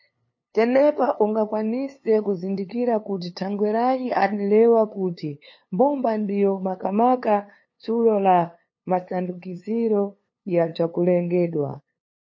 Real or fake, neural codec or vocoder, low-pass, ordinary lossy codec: fake; codec, 16 kHz, 2 kbps, FunCodec, trained on LibriTTS, 25 frames a second; 7.2 kHz; MP3, 32 kbps